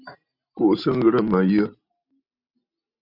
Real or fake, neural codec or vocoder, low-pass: real; none; 5.4 kHz